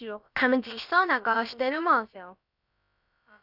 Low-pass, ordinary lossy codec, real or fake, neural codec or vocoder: 5.4 kHz; none; fake; codec, 16 kHz, about 1 kbps, DyCAST, with the encoder's durations